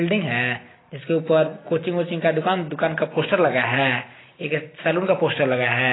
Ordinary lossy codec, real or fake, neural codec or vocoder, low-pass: AAC, 16 kbps; real; none; 7.2 kHz